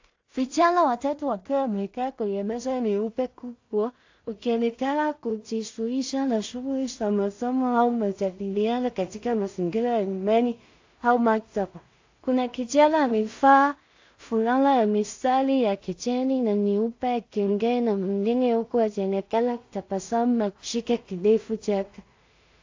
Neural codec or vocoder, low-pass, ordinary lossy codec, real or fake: codec, 16 kHz in and 24 kHz out, 0.4 kbps, LongCat-Audio-Codec, two codebook decoder; 7.2 kHz; AAC, 48 kbps; fake